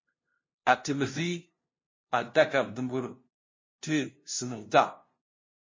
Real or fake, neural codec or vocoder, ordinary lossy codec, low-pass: fake; codec, 16 kHz, 0.5 kbps, FunCodec, trained on LibriTTS, 25 frames a second; MP3, 32 kbps; 7.2 kHz